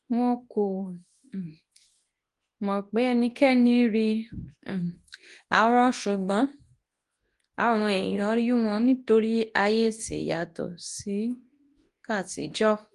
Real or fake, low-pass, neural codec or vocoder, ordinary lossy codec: fake; 10.8 kHz; codec, 24 kHz, 0.9 kbps, WavTokenizer, large speech release; Opus, 24 kbps